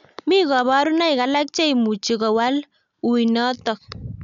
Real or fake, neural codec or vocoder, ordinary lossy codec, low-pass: real; none; MP3, 96 kbps; 7.2 kHz